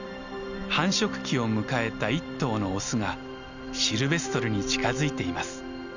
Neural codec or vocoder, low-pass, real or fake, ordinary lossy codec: none; 7.2 kHz; real; none